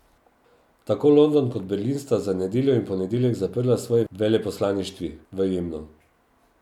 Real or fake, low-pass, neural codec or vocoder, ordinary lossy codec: real; 19.8 kHz; none; none